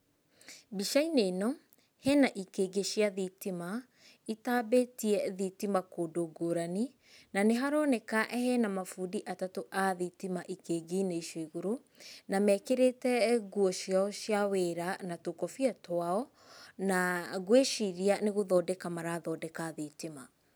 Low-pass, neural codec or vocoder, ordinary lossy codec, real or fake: none; none; none; real